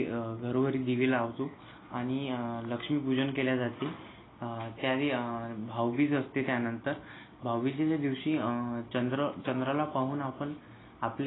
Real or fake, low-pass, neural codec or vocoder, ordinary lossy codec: real; 7.2 kHz; none; AAC, 16 kbps